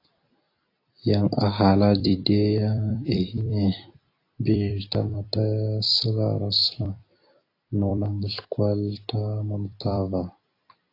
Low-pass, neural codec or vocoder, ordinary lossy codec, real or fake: 5.4 kHz; vocoder, 44.1 kHz, 128 mel bands every 256 samples, BigVGAN v2; AAC, 32 kbps; fake